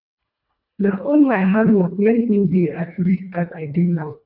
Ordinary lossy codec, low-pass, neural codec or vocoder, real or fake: none; 5.4 kHz; codec, 24 kHz, 1.5 kbps, HILCodec; fake